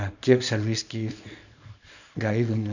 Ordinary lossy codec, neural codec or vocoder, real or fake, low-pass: none; codec, 24 kHz, 0.9 kbps, WavTokenizer, small release; fake; 7.2 kHz